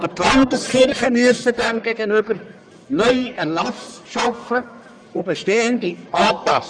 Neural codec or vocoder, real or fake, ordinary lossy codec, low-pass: codec, 44.1 kHz, 1.7 kbps, Pupu-Codec; fake; none; 9.9 kHz